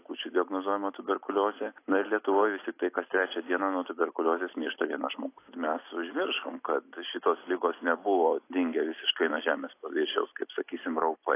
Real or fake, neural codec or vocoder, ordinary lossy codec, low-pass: real; none; AAC, 24 kbps; 3.6 kHz